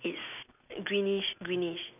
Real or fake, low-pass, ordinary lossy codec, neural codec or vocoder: real; 3.6 kHz; none; none